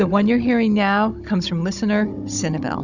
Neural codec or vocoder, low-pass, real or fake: codec, 16 kHz, 16 kbps, FunCodec, trained on Chinese and English, 50 frames a second; 7.2 kHz; fake